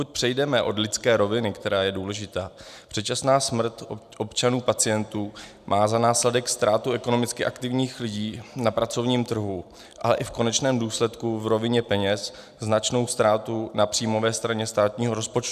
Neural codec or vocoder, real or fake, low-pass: none; real; 14.4 kHz